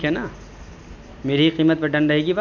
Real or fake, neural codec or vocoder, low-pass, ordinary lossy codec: real; none; 7.2 kHz; none